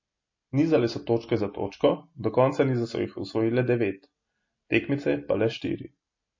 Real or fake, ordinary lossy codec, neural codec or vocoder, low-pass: real; MP3, 32 kbps; none; 7.2 kHz